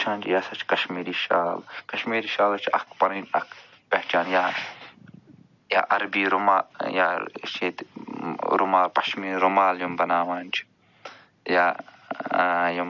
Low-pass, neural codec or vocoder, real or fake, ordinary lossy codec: 7.2 kHz; none; real; none